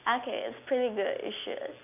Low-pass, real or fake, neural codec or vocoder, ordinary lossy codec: 3.6 kHz; real; none; none